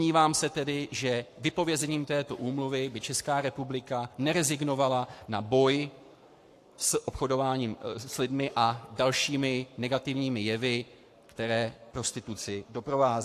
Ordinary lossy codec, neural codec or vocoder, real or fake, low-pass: AAC, 64 kbps; codec, 44.1 kHz, 7.8 kbps, Pupu-Codec; fake; 14.4 kHz